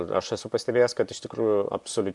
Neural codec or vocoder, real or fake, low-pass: none; real; 10.8 kHz